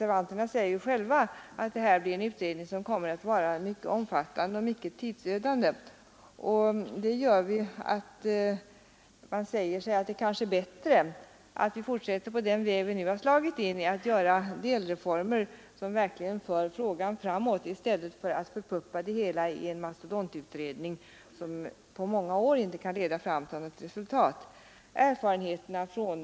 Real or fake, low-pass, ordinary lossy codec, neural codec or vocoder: real; none; none; none